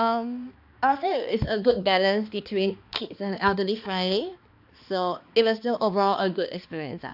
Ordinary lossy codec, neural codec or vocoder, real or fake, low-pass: AAC, 48 kbps; codec, 16 kHz, 2 kbps, X-Codec, HuBERT features, trained on balanced general audio; fake; 5.4 kHz